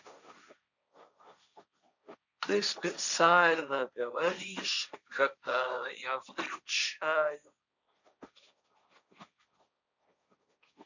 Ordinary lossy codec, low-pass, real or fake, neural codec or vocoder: none; 7.2 kHz; fake; codec, 16 kHz, 1.1 kbps, Voila-Tokenizer